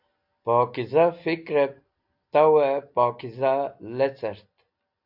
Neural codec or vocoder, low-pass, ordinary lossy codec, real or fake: none; 5.4 kHz; AAC, 48 kbps; real